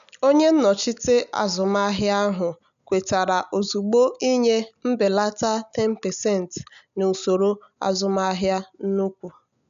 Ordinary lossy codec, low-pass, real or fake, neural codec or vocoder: none; 7.2 kHz; real; none